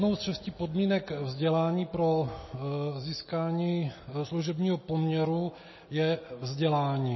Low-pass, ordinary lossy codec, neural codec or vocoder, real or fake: 7.2 kHz; MP3, 24 kbps; none; real